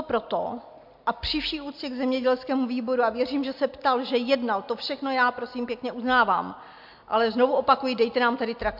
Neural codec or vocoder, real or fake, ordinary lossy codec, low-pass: none; real; MP3, 48 kbps; 5.4 kHz